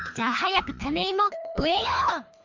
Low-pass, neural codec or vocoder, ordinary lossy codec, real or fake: 7.2 kHz; codec, 24 kHz, 3 kbps, HILCodec; MP3, 48 kbps; fake